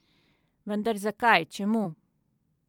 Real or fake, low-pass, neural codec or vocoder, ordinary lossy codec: fake; 19.8 kHz; vocoder, 44.1 kHz, 128 mel bands every 256 samples, BigVGAN v2; MP3, 96 kbps